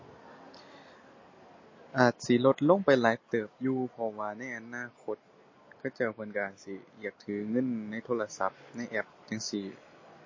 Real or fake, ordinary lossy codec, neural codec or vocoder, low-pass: real; MP3, 32 kbps; none; 7.2 kHz